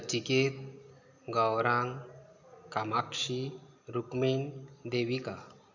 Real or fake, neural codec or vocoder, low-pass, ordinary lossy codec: real; none; 7.2 kHz; none